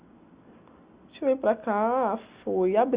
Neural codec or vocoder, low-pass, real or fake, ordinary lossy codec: none; 3.6 kHz; real; Opus, 64 kbps